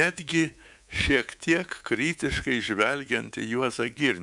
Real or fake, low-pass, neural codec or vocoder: fake; 10.8 kHz; codec, 24 kHz, 3.1 kbps, DualCodec